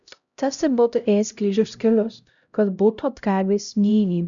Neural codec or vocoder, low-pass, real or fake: codec, 16 kHz, 0.5 kbps, X-Codec, HuBERT features, trained on LibriSpeech; 7.2 kHz; fake